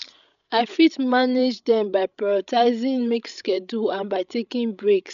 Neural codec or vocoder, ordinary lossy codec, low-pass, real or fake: codec, 16 kHz, 16 kbps, FreqCodec, larger model; none; 7.2 kHz; fake